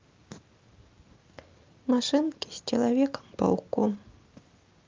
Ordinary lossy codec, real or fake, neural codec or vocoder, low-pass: Opus, 24 kbps; real; none; 7.2 kHz